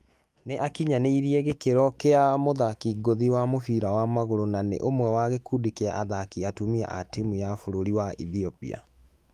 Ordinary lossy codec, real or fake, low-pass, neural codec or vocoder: Opus, 32 kbps; fake; 14.4 kHz; autoencoder, 48 kHz, 128 numbers a frame, DAC-VAE, trained on Japanese speech